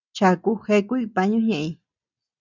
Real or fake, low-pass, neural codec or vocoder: real; 7.2 kHz; none